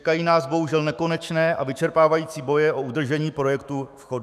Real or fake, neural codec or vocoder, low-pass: fake; autoencoder, 48 kHz, 128 numbers a frame, DAC-VAE, trained on Japanese speech; 14.4 kHz